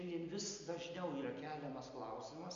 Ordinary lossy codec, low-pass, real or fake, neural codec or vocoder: MP3, 48 kbps; 7.2 kHz; real; none